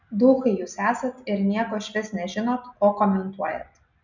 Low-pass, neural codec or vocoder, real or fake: 7.2 kHz; none; real